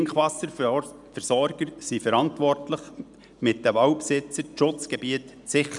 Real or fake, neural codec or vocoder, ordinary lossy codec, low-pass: real; none; none; 10.8 kHz